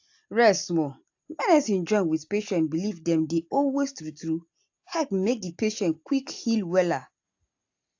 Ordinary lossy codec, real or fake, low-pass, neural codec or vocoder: AAC, 48 kbps; real; 7.2 kHz; none